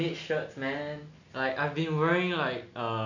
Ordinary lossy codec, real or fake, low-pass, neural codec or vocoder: none; real; 7.2 kHz; none